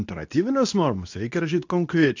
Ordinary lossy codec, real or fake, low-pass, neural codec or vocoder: AAC, 48 kbps; fake; 7.2 kHz; codec, 24 kHz, 0.9 kbps, WavTokenizer, medium speech release version 2